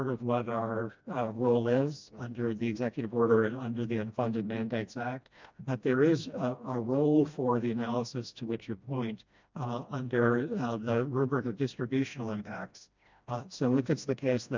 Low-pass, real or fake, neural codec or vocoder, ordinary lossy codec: 7.2 kHz; fake; codec, 16 kHz, 1 kbps, FreqCodec, smaller model; MP3, 64 kbps